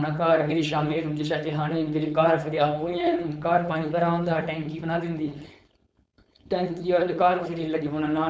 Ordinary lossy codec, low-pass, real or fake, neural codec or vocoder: none; none; fake; codec, 16 kHz, 4.8 kbps, FACodec